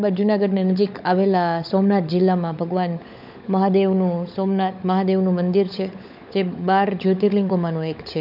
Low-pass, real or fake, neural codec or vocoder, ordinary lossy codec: 5.4 kHz; fake; codec, 16 kHz, 16 kbps, FunCodec, trained on LibriTTS, 50 frames a second; none